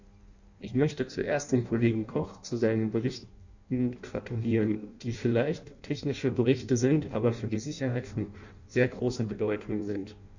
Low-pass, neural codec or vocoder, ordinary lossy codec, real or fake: 7.2 kHz; codec, 16 kHz in and 24 kHz out, 0.6 kbps, FireRedTTS-2 codec; none; fake